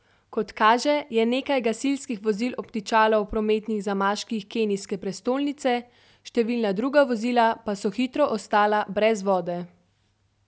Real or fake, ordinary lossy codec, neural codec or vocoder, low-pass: real; none; none; none